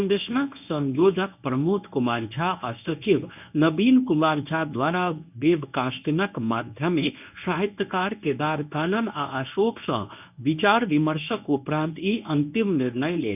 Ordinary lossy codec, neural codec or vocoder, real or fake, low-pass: none; codec, 24 kHz, 0.9 kbps, WavTokenizer, medium speech release version 1; fake; 3.6 kHz